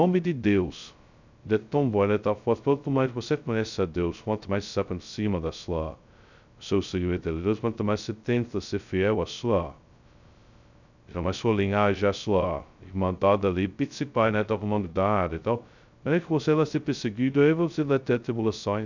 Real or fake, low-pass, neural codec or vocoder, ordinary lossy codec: fake; 7.2 kHz; codec, 16 kHz, 0.2 kbps, FocalCodec; none